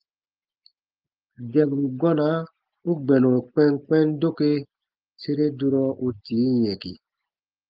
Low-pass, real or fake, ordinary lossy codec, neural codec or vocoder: 5.4 kHz; real; Opus, 32 kbps; none